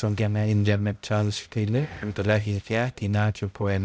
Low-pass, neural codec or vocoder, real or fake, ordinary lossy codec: none; codec, 16 kHz, 0.5 kbps, X-Codec, HuBERT features, trained on balanced general audio; fake; none